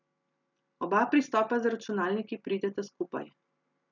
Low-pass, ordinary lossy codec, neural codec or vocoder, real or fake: 7.2 kHz; none; none; real